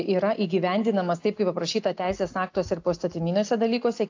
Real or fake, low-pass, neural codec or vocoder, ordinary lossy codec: real; 7.2 kHz; none; AAC, 48 kbps